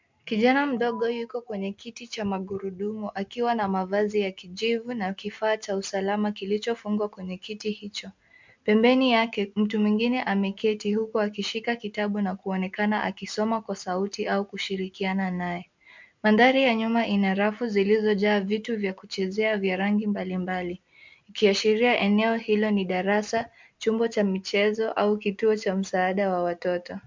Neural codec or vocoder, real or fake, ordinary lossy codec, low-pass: none; real; MP3, 64 kbps; 7.2 kHz